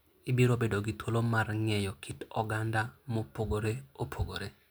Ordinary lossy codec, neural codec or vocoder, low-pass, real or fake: none; none; none; real